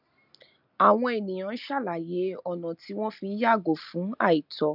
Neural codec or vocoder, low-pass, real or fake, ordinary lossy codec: none; 5.4 kHz; real; none